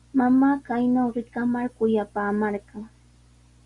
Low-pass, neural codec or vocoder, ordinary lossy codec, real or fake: 10.8 kHz; none; Opus, 64 kbps; real